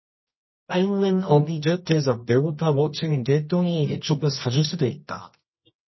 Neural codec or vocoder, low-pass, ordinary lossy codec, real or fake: codec, 24 kHz, 0.9 kbps, WavTokenizer, medium music audio release; 7.2 kHz; MP3, 24 kbps; fake